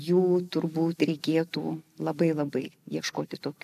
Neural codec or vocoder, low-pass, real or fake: none; 14.4 kHz; real